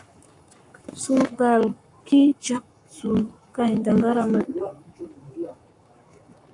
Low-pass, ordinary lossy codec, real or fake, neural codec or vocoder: 10.8 kHz; AAC, 64 kbps; fake; codec, 44.1 kHz, 7.8 kbps, Pupu-Codec